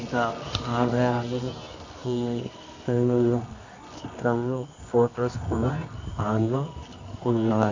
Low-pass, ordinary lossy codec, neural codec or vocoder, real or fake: 7.2 kHz; MP3, 48 kbps; codec, 24 kHz, 0.9 kbps, WavTokenizer, medium music audio release; fake